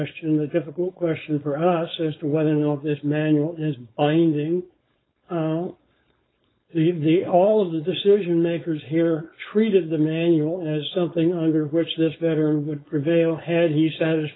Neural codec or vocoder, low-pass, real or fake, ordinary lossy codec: codec, 16 kHz, 4.8 kbps, FACodec; 7.2 kHz; fake; AAC, 16 kbps